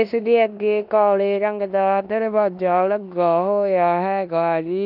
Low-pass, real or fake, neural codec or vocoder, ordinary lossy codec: 5.4 kHz; fake; codec, 16 kHz in and 24 kHz out, 0.9 kbps, LongCat-Audio-Codec, four codebook decoder; none